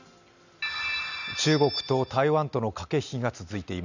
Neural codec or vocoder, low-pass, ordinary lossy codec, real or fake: none; 7.2 kHz; none; real